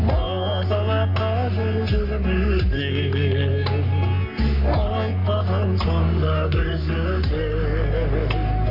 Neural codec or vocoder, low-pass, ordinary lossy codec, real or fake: codec, 44.1 kHz, 3.4 kbps, Pupu-Codec; 5.4 kHz; none; fake